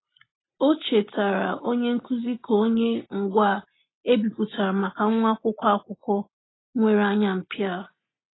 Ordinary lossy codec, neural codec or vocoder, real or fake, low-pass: AAC, 16 kbps; none; real; 7.2 kHz